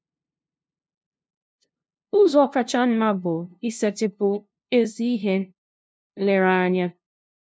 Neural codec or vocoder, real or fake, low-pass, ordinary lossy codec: codec, 16 kHz, 0.5 kbps, FunCodec, trained on LibriTTS, 25 frames a second; fake; none; none